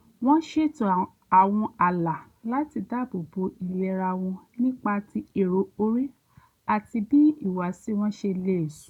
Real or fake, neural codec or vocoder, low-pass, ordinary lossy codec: real; none; 19.8 kHz; none